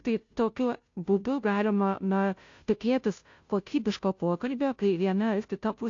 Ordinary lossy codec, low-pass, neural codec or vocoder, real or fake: AAC, 48 kbps; 7.2 kHz; codec, 16 kHz, 0.5 kbps, FunCodec, trained on Chinese and English, 25 frames a second; fake